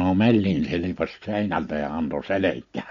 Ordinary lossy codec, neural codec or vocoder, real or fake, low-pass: MP3, 48 kbps; none; real; 7.2 kHz